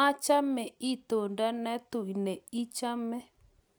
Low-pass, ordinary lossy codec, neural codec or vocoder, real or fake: none; none; none; real